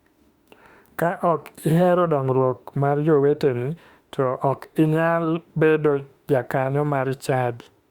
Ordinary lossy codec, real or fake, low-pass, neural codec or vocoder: Opus, 64 kbps; fake; 19.8 kHz; autoencoder, 48 kHz, 32 numbers a frame, DAC-VAE, trained on Japanese speech